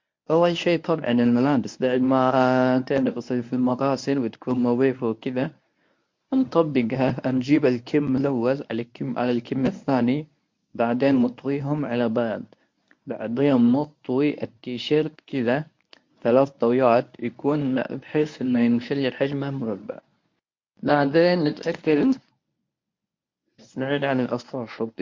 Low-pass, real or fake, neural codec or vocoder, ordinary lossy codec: 7.2 kHz; fake; codec, 24 kHz, 0.9 kbps, WavTokenizer, medium speech release version 1; MP3, 48 kbps